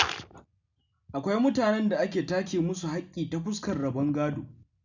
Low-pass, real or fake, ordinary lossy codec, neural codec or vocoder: 7.2 kHz; real; none; none